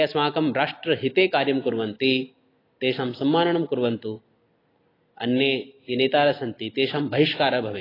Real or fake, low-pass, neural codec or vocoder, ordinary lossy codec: real; 5.4 kHz; none; AAC, 24 kbps